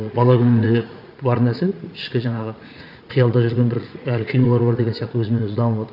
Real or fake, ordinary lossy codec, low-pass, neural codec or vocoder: fake; none; 5.4 kHz; vocoder, 44.1 kHz, 80 mel bands, Vocos